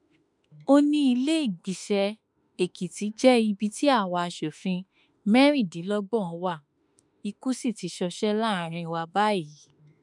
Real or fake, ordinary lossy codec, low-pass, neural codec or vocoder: fake; none; 10.8 kHz; autoencoder, 48 kHz, 32 numbers a frame, DAC-VAE, trained on Japanese speech